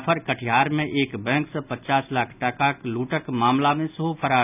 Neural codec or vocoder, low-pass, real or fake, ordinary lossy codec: none; 3.6 kHz; real; none